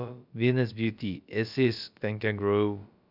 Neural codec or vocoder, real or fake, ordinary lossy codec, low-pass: codec, 16 kHz, about 1 kbps, DyCAST, with the encoder's durations; fake; none; 5.4 kHz